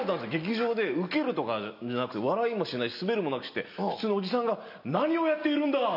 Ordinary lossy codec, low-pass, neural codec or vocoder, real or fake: none; 5.4 kHz; none; real